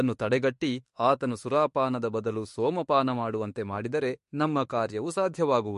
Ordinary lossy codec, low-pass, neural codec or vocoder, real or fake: MP3, 48 kbps; 14.4 kHz; autoencoder, 48 kHz, 32 numbers a frame, DAC-VAE, trained on Japanese speech; fake